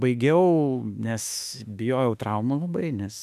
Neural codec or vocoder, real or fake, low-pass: autoencoder, 48 kHz, 32 numbers a frame, DAC-VAE, trained on Japanese speech; fake; 14.4 kHz